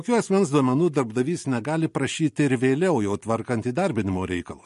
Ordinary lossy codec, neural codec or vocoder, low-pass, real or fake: MP3, 48 kbps; none; 14.4 kHz; real